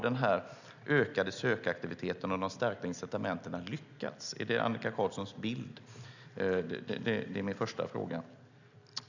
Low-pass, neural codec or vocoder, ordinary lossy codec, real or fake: 7.2 kHz; none; none; real